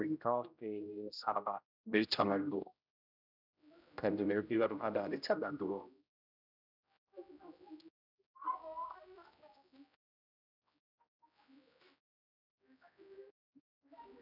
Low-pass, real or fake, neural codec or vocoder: 5.4 kHz; fake; codec, 16 kHz, 0.5 kbps, X-Codec, HuBERT features, trained on general audio